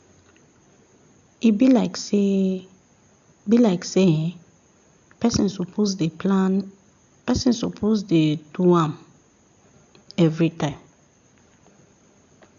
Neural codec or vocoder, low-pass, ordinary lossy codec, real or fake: none; 7.2 kHz; none; real